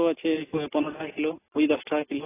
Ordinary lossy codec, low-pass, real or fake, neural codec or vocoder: AAC, 16 kbps; 3.6 kHz; real; none